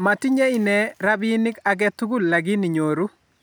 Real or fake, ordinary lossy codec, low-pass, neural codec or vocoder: real; none; none; none